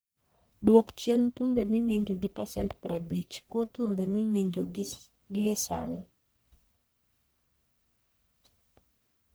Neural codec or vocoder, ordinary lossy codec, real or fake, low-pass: codec, 44.1 kHz, 1.7 kbps, Pupu-Codec; none; fake; none